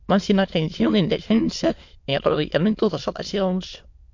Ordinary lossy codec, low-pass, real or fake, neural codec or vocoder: MP3, 48 kbps; 7.2 kHz; fake; autoencoder, 22.05 kHz, a latent of 192 numbers a frame, VITS, trained on many speakers